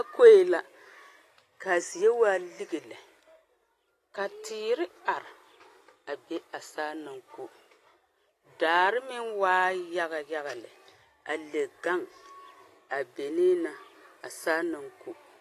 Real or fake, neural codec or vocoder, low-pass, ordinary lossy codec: real; none; 14.4 kHz; AAC, 48 kbps